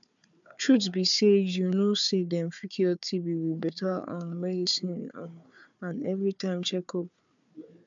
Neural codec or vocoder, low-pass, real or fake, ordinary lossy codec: codec, 16 kHz, 4 kbps, FunCodec, trained on Chinese and English, 50 frames a second; 7.2 kHz; fake; MP3, 64 kbps